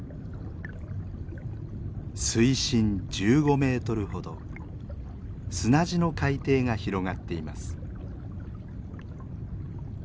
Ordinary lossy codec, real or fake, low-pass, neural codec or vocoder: none; real; none; none